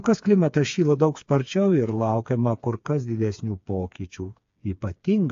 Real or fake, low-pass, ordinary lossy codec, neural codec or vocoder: fake; 7.2 kHz; AAC, 48 kbps; codec, 16 kHz, 4 kbps, FreqCodec, smaller model